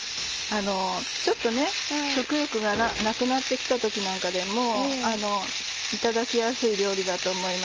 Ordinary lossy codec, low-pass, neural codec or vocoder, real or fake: Opus, 24 kbps; 7.2 kHz; none; real